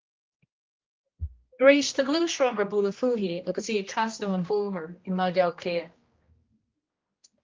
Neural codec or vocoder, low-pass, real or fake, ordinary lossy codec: codec, 16 kHz, 1 kbps, X-Codec, HuBERT features, trained on general audio; 7.2 kHz; fake; Opus, 24 kbps